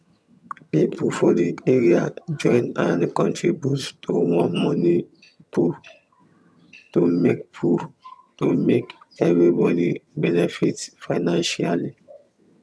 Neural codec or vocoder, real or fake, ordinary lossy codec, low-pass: vocoder, 22.05 kHz, 80 mel bands, HiFi-GAN; fake; none; none